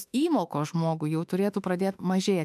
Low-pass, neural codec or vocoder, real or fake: 14.4 kHz; autoencoder, 48 kHz, 32 numbers a frame, DAC-VAE, trained on Japanese speech; fake